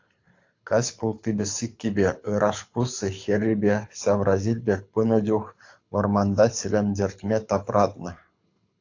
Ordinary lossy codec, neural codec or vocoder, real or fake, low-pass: AAC, 48 kbps; codec, 24 kHz, 6 kbps, HILCodec; fake; 7.2 kHz